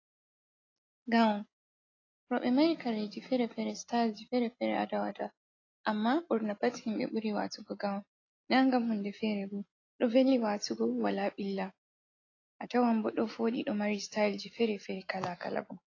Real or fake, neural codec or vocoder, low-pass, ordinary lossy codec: real; none; 7.2 kHz; AAC, 32 kbps